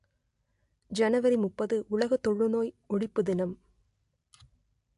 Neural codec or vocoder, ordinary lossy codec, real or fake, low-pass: none; AAC, 64 kbps; real; 10.8 kHz